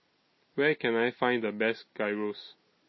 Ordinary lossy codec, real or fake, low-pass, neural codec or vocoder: MP3, 24 kbps; real; 7.2 kHz; none